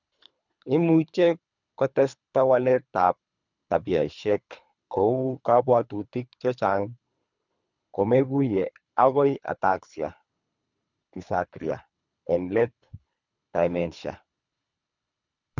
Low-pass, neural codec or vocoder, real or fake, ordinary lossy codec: 7.2 kHz; codec, 24 kHz, 3 kbps, HILCodec; fake; none